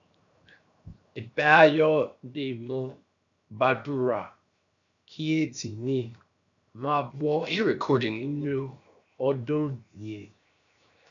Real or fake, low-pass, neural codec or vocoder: fake; 7.2 kHz; codec, 16 kHz, 0.7 kbps, FocalCodec